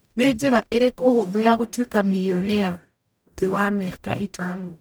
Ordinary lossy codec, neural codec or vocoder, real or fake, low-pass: none; codec, 44.1 kHz, 0.9 kbps, DAC; fake; none